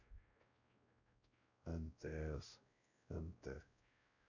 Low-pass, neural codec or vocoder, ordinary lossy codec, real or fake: 7.2 kHz; codec, 16 kHz, 0.5 kbps, X-Codec, WavLM features, trained on Multilingual LibriSpeech; none; fake